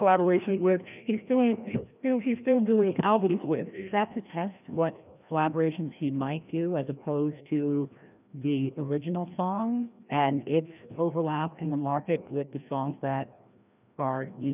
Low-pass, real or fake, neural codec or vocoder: 3.6 kHz; fake; codec, 16 kHz, 1 kbps, FreqCodec, larger model